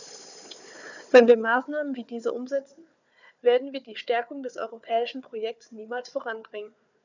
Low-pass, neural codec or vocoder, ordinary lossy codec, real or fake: 7.2 kHz; codec, 16 kHz, 4 kbps, FunCodec, trained on Chinese and English, 50 frames a second; none; fake